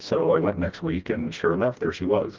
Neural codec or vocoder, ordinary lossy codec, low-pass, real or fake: codec, 16 kHz, 1 kbps, FreqCodec, smaller model; Opus, 32 kbps; 7.2 kHz; fake